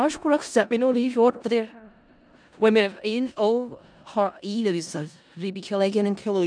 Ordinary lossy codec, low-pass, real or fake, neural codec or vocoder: none; 9.9 kHz; fake; codec, 16 kHz in and 24 kHz out, 0.4 kbps, LongCat-Audio-Codec, four codebook decoder